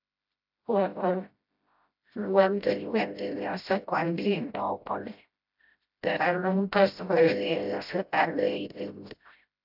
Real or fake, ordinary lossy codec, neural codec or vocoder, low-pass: fake; AAC, 48 kbps; codec, 16 kHz, 0.5 kbps, FreqCodec, smaller model; 5.4 kHz